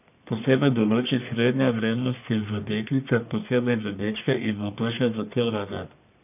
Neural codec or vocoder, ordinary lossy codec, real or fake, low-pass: codec, 44.1 kHz, 1.7 kbps, Pupu-Codec; none; fake; 3.6 kHz